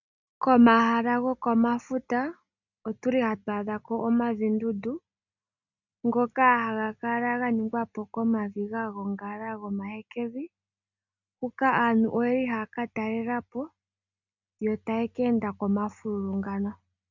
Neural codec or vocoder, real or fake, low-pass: none; real; 7.2 kHz